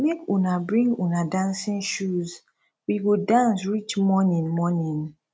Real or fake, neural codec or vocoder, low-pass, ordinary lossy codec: real; none; none; none